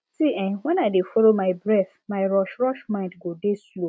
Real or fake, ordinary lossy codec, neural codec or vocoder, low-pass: real; none; none; none